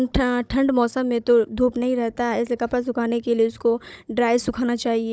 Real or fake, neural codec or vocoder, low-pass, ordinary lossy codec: fake; codec, 16 kHz, 16 kbps, FunCodec, trained on Chinese and English, 50 frames a second; none; none